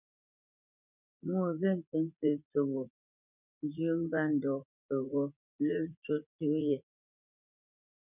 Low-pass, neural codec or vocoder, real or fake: 3.6 kHz; vocoder, 44.1 kHz, 80 mel bands, Vocos; fake